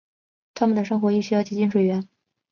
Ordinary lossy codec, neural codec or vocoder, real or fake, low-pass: MP3, 64 kbps; none; real; 7.2 kHz